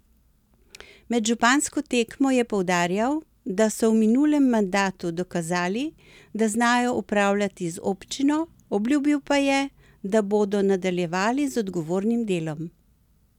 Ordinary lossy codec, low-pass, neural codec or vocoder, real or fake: none; 19.8 kHz; none; real